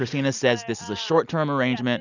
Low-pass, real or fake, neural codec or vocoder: 7.2 kHz; real; none